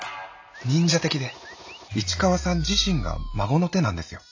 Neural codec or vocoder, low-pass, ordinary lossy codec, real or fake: none; 7.2 kHz; AAC, 48 kbps; real